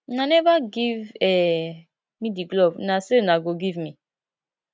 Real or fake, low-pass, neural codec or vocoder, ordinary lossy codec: real; none; none; none